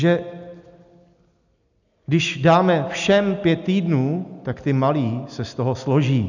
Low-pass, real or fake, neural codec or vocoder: 7.2 kHz; real; none